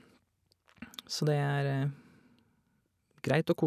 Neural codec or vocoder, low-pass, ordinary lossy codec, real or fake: none; 14.4 kHz; none; real